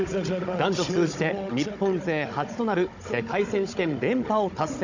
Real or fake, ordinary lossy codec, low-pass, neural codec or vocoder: fake; none; 7.2 kHz; codec, 16 kHz, 16 kbps, FunCodec, trained on Chinese and English, 50 frames a second